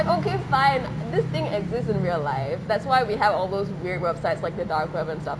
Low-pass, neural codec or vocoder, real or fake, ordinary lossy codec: none; none; real; none